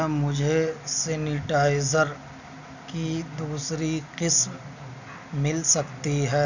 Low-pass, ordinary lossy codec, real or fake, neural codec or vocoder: 7.2 kHz; none; real; none